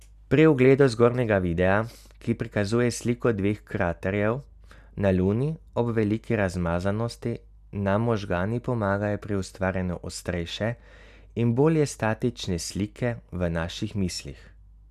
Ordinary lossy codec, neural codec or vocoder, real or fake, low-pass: none; none; real; 14.4 kHz